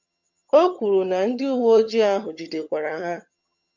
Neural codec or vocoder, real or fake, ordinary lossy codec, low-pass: vocoder, 22.05 kHz, 80 mel bands, HiFi-GAN; fake; MP3, 48 kbps; 7.2 kHz